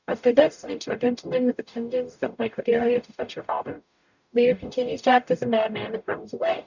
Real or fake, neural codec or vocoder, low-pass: fake; codec, 44.1 kHz, 0.9 kbps, DAC; 7.2 kHz